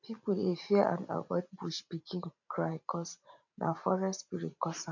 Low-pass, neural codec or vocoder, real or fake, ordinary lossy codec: 7.2 kHz; vocoder, 44.1 kHz, 128 mel bands every 512 samples, BigVGAN v2; fake; none